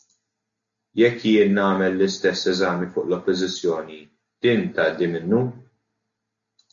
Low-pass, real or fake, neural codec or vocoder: 7.2 kHz; real; none